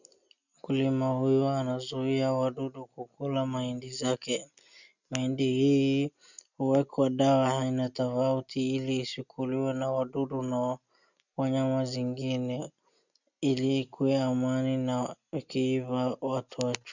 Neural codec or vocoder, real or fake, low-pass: none; real; 7.2 kHz